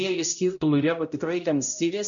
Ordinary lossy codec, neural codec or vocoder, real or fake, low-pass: MP3, 64 kbps; codec, 16 kHz, 0.5 kbps, X-Codec, HuBERT features, trained on balanced general audio; fake; 7.2 kHz